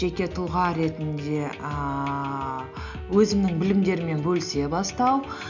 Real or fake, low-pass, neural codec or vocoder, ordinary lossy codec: real; 7.2 kHz; none; none